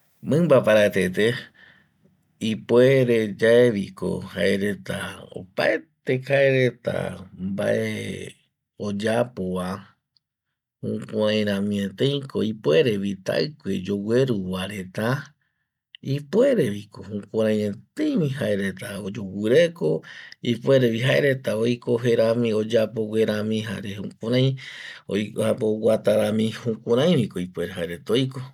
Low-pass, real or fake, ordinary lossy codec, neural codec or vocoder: 19.8 kHz; real; none; none